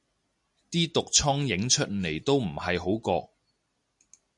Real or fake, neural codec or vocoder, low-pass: real; none; 10.8 kHz